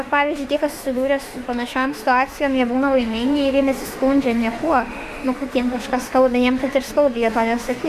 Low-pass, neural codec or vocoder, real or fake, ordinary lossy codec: 14.4 kHz; autoencoder, 48 kHz, 32 numbers a frame, DAC-VAE, trained on Japanese speech; fake; Opus, 64 kbps